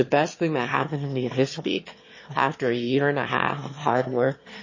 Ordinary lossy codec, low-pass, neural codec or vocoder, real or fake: MP3, 32 kbps; 7.2 kHz; autoencoder, 22.05 kHz, a latent of 192 numbers a frame, VITS, trained on one speaker; fake